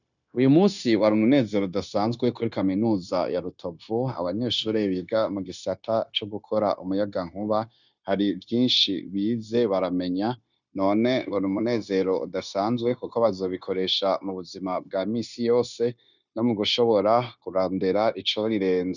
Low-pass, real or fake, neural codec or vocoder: 7.2 kHz; fake; codec, 16 kHz, 0.9 kbps, LongCat-Audio-Codec